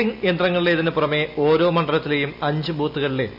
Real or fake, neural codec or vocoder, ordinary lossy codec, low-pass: real; none; none; 5.4 kHz